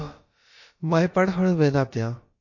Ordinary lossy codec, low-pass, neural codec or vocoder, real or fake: MP3, 32 kbps; 7.2 kHz; codec, 16 kHz, about 1 kbps, DyCAST, with the encoder's durations; fake